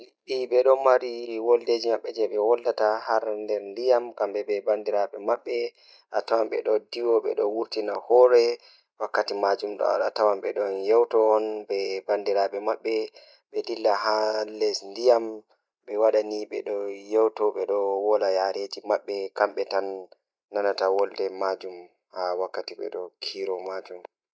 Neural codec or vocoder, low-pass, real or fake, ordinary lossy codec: none; none; real; none